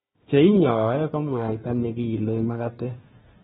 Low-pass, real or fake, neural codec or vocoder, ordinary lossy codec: 7.2 kHz; fake; codec, 16 kHz, 1 kbps, FunCodec, trained on Chinese and English, 50 frames a second; AAC, 16 kbps